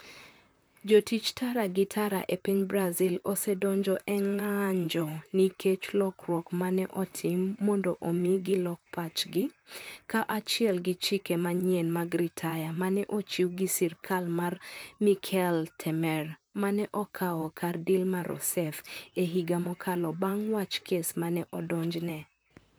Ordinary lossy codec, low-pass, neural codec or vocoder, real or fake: none; none; vocoder, 44.1 kHz, 128 mel bands, Pupu-Vocoder; fake